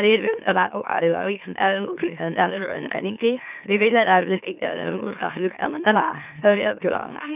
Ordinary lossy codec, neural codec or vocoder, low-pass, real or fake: none; autoencoder, 44.1 kHz, a latent of 192 numbers a frame, MeloTTS; 3.6 kHz; fake